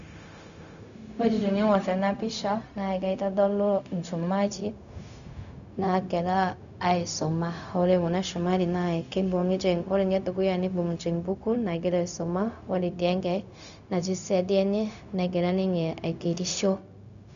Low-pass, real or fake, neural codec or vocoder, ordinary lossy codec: 7.2 kHz; fake; codec, 16 kHz, 0.4 kbps, LongCat-Audio-Codec; none